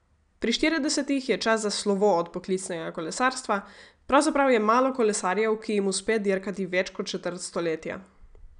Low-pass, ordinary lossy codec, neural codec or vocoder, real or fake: 9.9 kHz; none; none; real